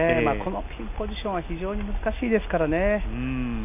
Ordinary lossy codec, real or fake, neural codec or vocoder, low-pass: none; real; none; 3.6 kHz